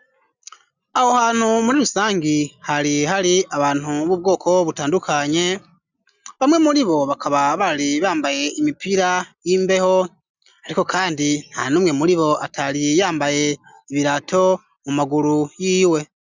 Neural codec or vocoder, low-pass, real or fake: none; 7.2 kHz; real